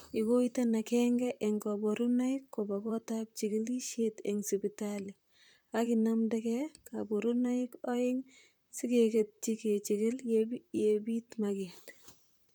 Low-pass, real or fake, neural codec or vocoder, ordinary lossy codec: none; fake; vocoder, 44.1 kHz, 128 mel bands, Pupu-Vocoder; none